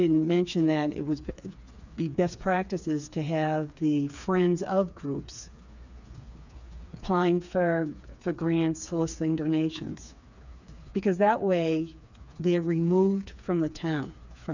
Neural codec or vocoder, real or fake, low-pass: codec, 16 kHz, 4 kbps, FreqCodec, smaller model; fake; 7.2 kHz